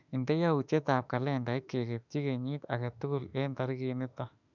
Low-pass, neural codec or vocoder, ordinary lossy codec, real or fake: 7.2 kHz; autoencoder, 48 kHz, 32 numbers a frame, DAC-VAE, trained on Japanese speech; none; fake